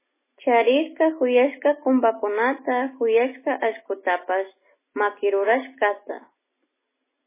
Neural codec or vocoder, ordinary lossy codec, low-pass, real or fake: none; MP3, 16 kbps; 3.6 kHz; real